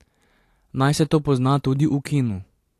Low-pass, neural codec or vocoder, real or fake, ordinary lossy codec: 14.4 kHz; vocoder, 44.1 kHz, 128 mel bands every 512 samples, BigVGAN v2; fake; AAC, 64 kbps